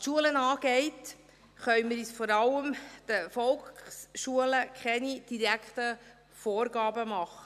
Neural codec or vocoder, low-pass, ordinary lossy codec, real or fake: none; 14.4 kHz; none; real